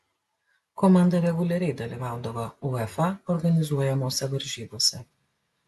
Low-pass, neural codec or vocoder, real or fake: 14.4 kHz; none; real